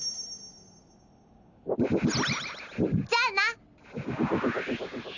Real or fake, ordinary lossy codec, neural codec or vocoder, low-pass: real; none; none; 7.2 kHz